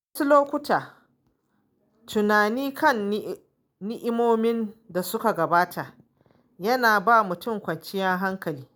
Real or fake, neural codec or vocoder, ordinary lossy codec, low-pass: real; none; none; none